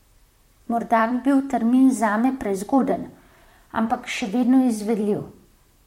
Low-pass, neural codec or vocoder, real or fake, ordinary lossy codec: 19.8 kHz; vocoder, 44.1 kHz, 128 mel bands, Pupu-Vocoder; fake; MP3, 64 kbps